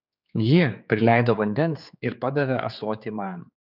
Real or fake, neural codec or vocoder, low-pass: fake; codec, 16 kHz, 4 kbps, X-Codec, HuBERT features, trained on general audio; 5.4 kHz